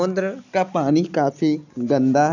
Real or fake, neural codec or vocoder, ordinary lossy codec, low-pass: real; none; none; 7.2 kHz